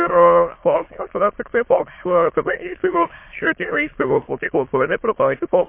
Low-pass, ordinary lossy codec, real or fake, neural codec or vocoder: 3.6 kHz; MP3, 24 kbps; fake; autoencoder, 22.05 kHz, a latent of 192 numbers a frame, VITS, trained on many speakers